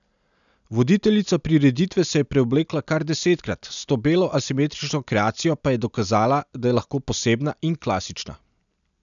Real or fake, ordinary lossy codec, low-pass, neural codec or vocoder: real; none; 7.2 kHz; none